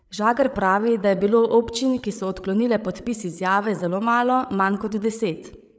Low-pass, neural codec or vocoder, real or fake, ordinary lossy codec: none; codec, 16 kHz, 8 kbps, FreqCodec, larger model; fake; none